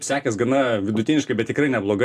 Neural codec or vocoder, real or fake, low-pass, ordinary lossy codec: none; real; 14.4 kHz; AAC, 64 kbps